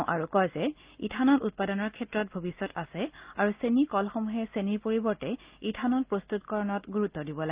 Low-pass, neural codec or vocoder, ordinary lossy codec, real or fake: 3.6 kHz; none; Opus, 32 kbps; real